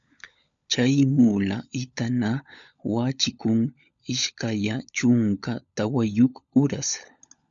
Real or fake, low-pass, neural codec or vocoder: fake; 7.2 kHz; codec, 16 kHz, 16 kbps, FunCodec, trained on LibriTTS, 50 frames a second